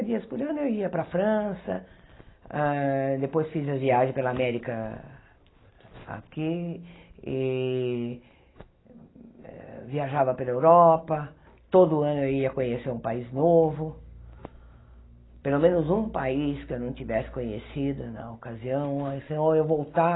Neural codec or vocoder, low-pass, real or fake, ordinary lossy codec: none; 7.2 kHz; real; AAC, 16 kbps